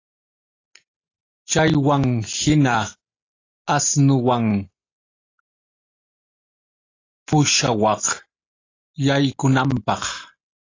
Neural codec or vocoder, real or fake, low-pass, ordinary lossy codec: none; real; 7.2 kHz; AAC, 32 kbps